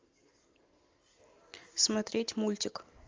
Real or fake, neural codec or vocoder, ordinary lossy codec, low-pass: real; none; Opus, 32 kbps; 7.2 kHz